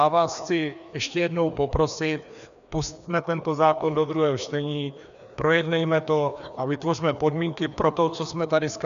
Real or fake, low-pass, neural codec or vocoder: fake; 7.2 kHz; codec, 16 kHz, 2 kbps, FreqCodec, larger model